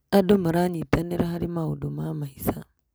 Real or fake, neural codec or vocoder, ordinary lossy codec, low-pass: real; none; none; none